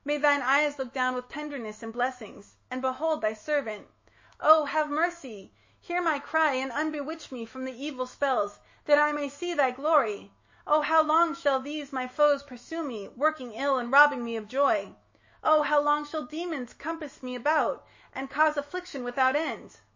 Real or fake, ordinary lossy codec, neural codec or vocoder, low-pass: fake; MP3, 32 kbps; autoencoder, 48 kHz, 128 numbers a frame, DAC-VAE, trained on Japanese speech; 7.2 kHz